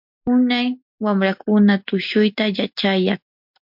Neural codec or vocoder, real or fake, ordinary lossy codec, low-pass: none; real; MP3, 48 kbps; 5.4 kHz